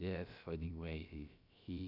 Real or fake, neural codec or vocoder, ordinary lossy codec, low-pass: fake; codec, 16 kHz, about 1 kbps, DyCAST, with the encoder's durations; none; 5.4 kHz